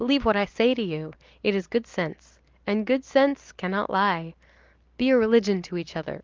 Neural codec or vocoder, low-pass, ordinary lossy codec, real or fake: none; 7.2 kHz; Opus, 24 kbps; real